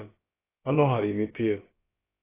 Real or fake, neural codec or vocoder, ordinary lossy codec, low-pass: fake; codec, 16 kHz, about 1 kbps, DyCAST, with the encoder's durations; AAC, 16 kbps; 3.6 kHz